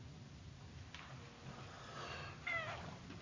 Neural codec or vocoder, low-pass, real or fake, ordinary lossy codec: none; 7.2 kHz; real; AAC, 32 kbps